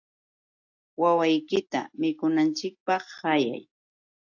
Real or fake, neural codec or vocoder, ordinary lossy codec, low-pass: real; none; AAC, 48 kbps; 7.2 kHz